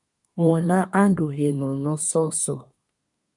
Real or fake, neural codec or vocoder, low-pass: fake; codec, 24 kHz, 1 kbps, SNAC; 10.8 kHz